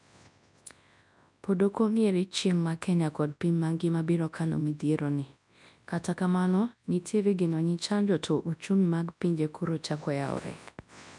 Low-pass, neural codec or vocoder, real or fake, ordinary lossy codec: 10.8 kHz; codec, 24 kHz, 0.9 kbps, WavTokenizer, large speech release; fake; none